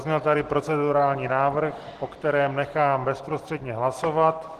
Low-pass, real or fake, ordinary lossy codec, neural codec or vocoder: 14.4 kHz; real; Opus, 16 kbps; none